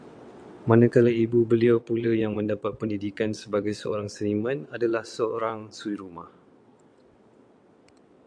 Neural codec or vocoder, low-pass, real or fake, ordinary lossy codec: vocoder, 22.05 kHz, 80 mel bands, WaveNeXt; 9.9 kHz; fake; MP3, 64 kbps